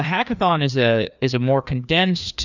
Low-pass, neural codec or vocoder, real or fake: 7.2 kHz; codec, 16 kHz, 2 kbps, FreqCodec, larger model; fake